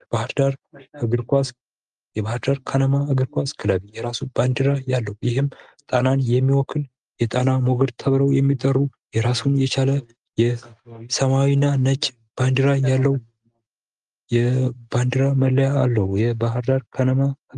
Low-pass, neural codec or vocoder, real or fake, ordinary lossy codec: 10.8 kHz; none; real; Opus, 32 kbps